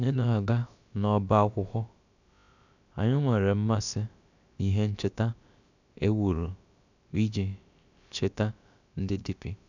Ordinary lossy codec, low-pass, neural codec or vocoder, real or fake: none; 7.2 kHz; codec, 16 kHz, about 1 kbps, DyCAST, with the encoder's durations; fake